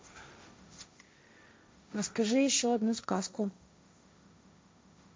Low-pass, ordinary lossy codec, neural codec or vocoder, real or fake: none; none; codec, 16 kHz, 1.1 kbps, Voila-Tokenizer; fake